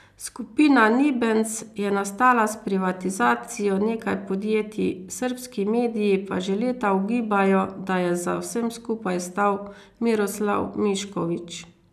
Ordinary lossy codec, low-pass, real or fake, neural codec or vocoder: none; 14.4 kHz; real; none